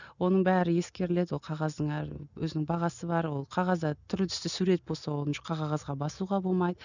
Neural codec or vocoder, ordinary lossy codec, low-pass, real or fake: none; none; 7.2 kHz; real